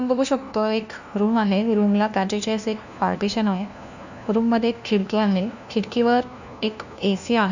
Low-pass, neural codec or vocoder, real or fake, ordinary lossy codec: 7.2 kHz; codec, 16 kHz, 1 kbps, FunCodec, trained on LibriTTS, 50 frames a second; fake; none